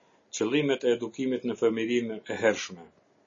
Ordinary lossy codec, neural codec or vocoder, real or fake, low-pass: MP3, 32 kbps; none; real; 7.2 kHz